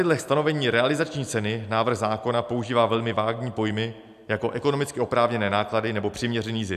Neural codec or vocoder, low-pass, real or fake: none; 14.4 kHz; real